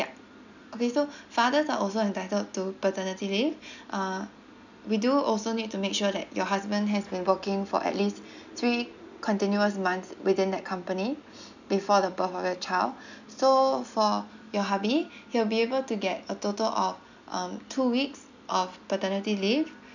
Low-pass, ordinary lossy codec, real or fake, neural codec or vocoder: 7.2 kHz; none; real; none